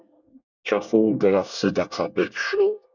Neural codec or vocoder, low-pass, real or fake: codec, 24 kHz, 1 kbps, SNAC; 7.2 kHz; fake